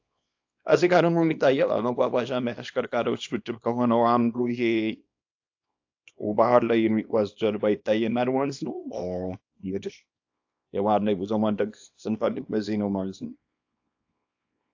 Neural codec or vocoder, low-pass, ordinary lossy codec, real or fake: codec, 24 kHz, 0.9 kbps, WavTokenizer, small release; 7.2 kHz; AAC, 48 kbps; fake